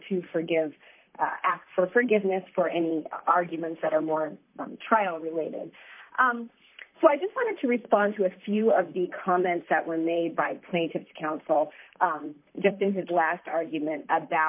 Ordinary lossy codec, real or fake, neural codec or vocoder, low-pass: MP3, 24 kbps; fake; vocoder, 44.1 kHz, 128 mel bands, Pupu-Vocoder; 3.6 kHz